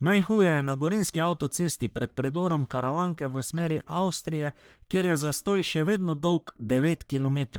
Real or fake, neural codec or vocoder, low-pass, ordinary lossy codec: fake; codec, 44.1 kHz, 1.7 kbps, Pupu-Codec; none; none